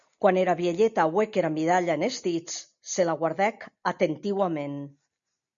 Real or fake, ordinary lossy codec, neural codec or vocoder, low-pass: real; MP3, 96 kbps; none; 7.2 kHz